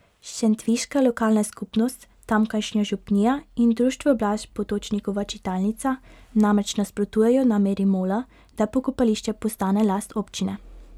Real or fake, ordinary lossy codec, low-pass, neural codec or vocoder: real; none; 19.8 kHz; none